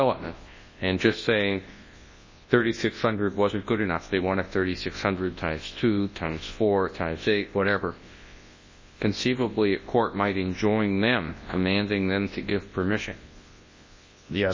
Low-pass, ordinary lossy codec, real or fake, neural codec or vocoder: 7.2 kHz; MP3, 32 kbps; fake; codec, 24 kHz, 0.9 kbps, WavTokenizer, large speech release